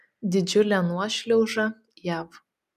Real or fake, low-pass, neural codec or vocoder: real; 14.4 kHz; none